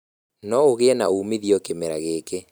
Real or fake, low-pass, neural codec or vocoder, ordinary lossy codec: real; none; none; none